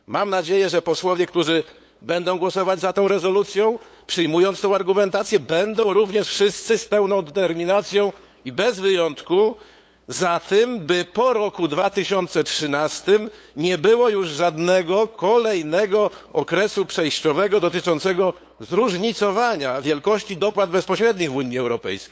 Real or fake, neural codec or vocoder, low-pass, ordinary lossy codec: fake; codec, 16 kHz, 8 kbps, FunCodec, trained on LibriTTS, 25 frames a second; none; none